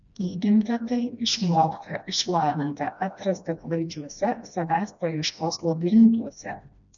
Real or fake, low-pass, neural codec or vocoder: fake; 7.2 kHz; codec, 16 kHz, 1 kbps, FreqCodec, smaller model